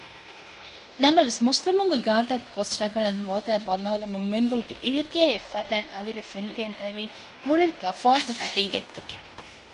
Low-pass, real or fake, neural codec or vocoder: 10.8 kHz; fake; codec, 16 kHz in and 24 kHz out, 0.9 kbps, LongCat-Audio-Codec, fine tuned four codebook decoder